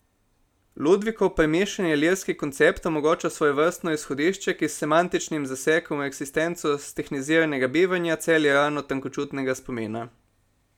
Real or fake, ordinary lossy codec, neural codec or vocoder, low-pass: real; none; none; 19.8 kHz